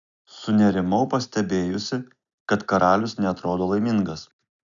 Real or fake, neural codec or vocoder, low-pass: real; none; 7.2 kHz